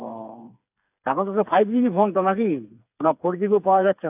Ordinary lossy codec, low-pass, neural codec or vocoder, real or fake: AAC, 32 kbps; 3.6 kHz; codec, 16 kHz, 4 kbps, FreqCodec, smaller model; fake